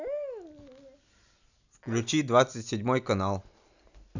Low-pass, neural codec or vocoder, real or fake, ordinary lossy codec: 7.2 kHz; none; real; none